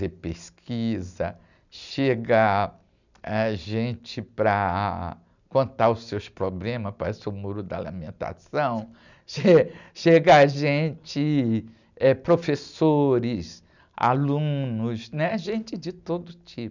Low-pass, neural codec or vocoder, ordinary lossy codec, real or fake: 7.2 kHz; none; none; real